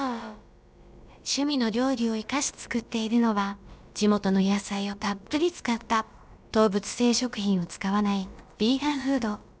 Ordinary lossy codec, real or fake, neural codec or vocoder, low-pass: none; fake; codec, 16 kHz, about 1 kbps, DyCAST, with the encoder's durations; none